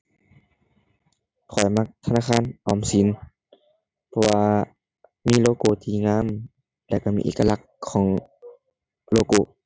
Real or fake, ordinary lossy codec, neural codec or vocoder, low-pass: real; none; none; none